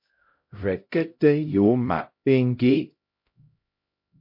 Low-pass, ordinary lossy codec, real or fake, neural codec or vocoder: 5.4 kHz; MP3, 32 kbps; fake; codec, 16 kHz, 0.5 kbps, X-Codec, HuBERT features, trained on LibriSpeech